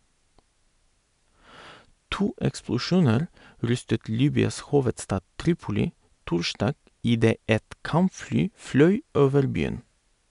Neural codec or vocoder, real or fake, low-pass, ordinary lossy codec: none; real; 10.8 kHz; none